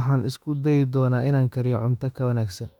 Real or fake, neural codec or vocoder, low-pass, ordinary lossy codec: fake; autoencoder, 48 kHz, 32 numbers a frame, DAC-VAE, trained on Japanese speech; 19.8 kHz; none